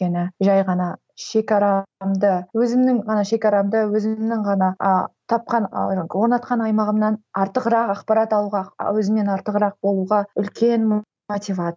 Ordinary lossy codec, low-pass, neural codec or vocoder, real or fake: none; none; none; real